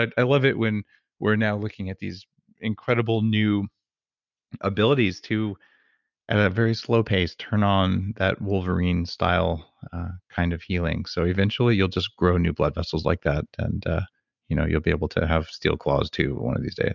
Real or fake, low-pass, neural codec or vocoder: real; 7.2 kHz; none